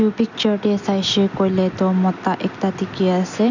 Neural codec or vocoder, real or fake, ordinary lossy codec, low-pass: none; real; none; 7.2 kHz